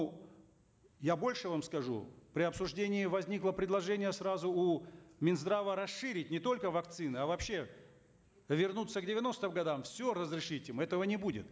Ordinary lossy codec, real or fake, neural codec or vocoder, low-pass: none; real; none; none